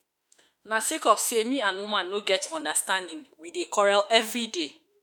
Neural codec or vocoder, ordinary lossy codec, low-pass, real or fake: autoencoder, 48 kHz, 32 numbers a frame, DAC-VAE, trained on Japanese speech; none; none; fake